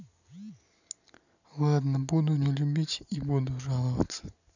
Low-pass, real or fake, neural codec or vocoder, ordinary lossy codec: 7.2 kHz; real; none; none